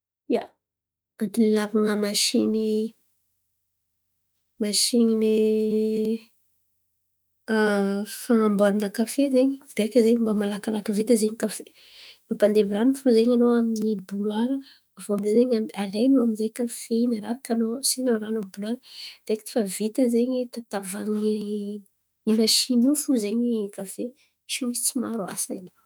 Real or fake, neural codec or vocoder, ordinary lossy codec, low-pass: fake; autoencoder, 48 kHz, 32 numbers a frame, DAC-VAE, trained on Japanese speech; none; none